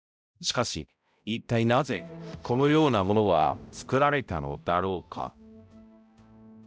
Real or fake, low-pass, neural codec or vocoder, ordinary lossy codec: fake; none; codec, 16 kHz, 0.5 kbps, X-Codec, HuBERT features, trained on balanced general audio; none